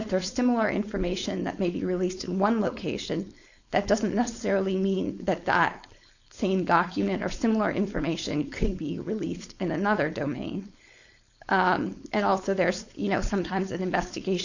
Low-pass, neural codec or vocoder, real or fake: 7.2 kHz; codec, 16 kHz, 4.8 kbps, FACodec; fake